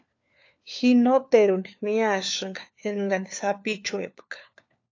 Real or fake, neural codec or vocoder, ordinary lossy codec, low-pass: fake; codec, 16 kHz, 4 kbps, FunCodec, trained on LibriTTS, 50 frames a second; AAC, 48 kbps; 7.2 kHz